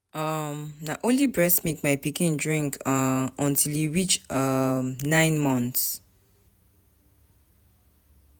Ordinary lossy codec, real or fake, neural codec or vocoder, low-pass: none; fake; vocoder, 48 kHz, 128 mel bands, Vocos; none